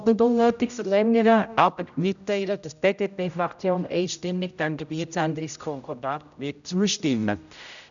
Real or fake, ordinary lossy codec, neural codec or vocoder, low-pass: fake; none; codec, 16 kHz, 0.5 kbps, X-Codec, HuBERT features, trained on general audio; 7.2 kHz